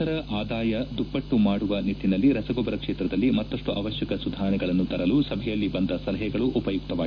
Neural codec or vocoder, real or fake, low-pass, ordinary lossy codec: none; real; 7.2 kHz; AAC, 48 kbps